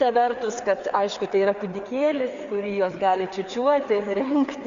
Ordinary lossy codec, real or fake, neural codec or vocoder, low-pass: Opus, 64 kbps; fake; codec, 16 kHz, 4 kbps, FreqCodec, larger model; 7.2 kHz